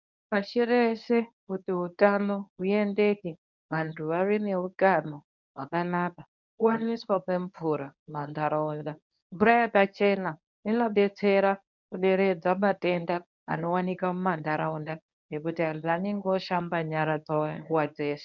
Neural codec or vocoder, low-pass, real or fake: codec, 24 kHz, 0.9 kbps, WavTokenizer, medium speech release version 1; 7.2 kHz; fake